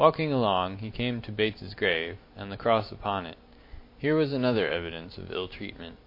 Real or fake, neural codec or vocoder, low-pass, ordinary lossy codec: real; none; 5.4 kHz; MP3, 32 kbps